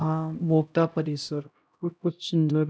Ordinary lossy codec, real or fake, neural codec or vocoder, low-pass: none; fake; codec, 16 kHz, 0.5 kbps, X-Codec, HuBERT features, trained on LibriSpeech; none